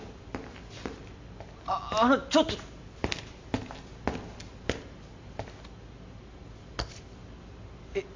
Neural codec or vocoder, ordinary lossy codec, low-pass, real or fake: none; MP3, 64 kbps; 7.2 kHz; real